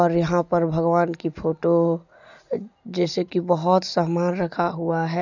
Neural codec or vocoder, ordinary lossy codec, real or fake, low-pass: none; none; real; 7.2 kHz